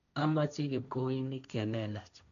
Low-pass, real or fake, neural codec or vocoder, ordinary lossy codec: 7.2 kHz; fake; codec, 16 kHz, 1.1 kbps, Voila-Tokenizer; none